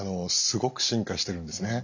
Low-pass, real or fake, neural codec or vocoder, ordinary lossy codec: 7.2 kHz; real; none; none